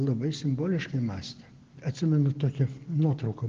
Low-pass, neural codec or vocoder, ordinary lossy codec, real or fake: 7.2 kHz; none; Opus, 16 kbps; real